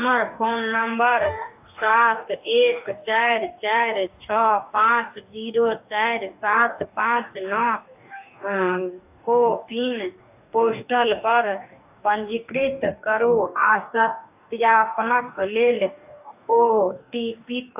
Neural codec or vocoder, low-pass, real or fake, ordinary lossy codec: codec, 44.1 kHz, 2.6 kbps, DAC; 3.6 kHz; fake; AAC, 32 kbps